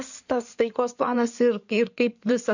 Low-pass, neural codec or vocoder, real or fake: 7.2 kHz; codec, 16 kHz in and 24 kHz out, 2.2 kbps, FireRedTTS-2 codec; fake